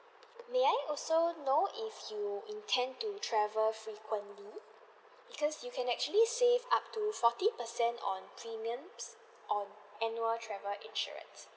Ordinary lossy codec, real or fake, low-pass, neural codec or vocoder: none; real; none; none